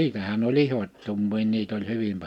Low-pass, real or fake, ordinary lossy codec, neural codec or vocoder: 19.8 kHz; real; none; none